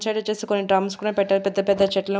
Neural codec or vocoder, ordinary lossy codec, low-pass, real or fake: none; none; none; real